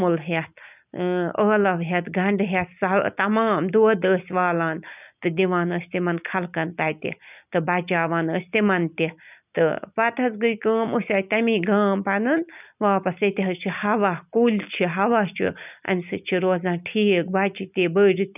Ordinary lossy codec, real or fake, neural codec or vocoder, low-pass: none; real; none; 3.6 kHz